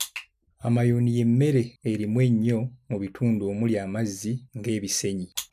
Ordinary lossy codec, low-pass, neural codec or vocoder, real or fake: none; 14.4 kHz; none; real